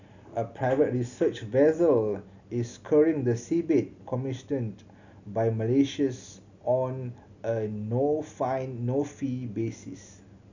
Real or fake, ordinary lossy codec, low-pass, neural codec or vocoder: real; none; 7.2 kHz; none